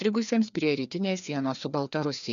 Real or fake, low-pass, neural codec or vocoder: fake; 7.2 kHz; codec, 16 kHz, 2 kbps, FreqCodec, larger model